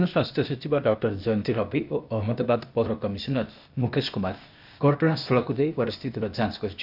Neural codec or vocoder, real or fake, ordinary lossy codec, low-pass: codec, 16 kHz, 0.8 kbps, ZipCodec; fake; none; 5.4 kHz